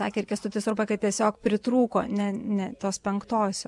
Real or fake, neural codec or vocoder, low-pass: fake; vocoder, 24 kHz, 100 mel bands, Vocos; 10.8 kHz